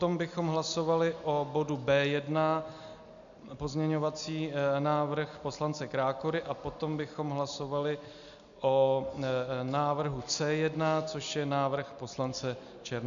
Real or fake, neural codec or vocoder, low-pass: real; none; 7.2 kHz